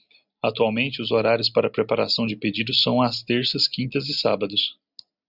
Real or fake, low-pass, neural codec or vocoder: real; 5.4 kHz; none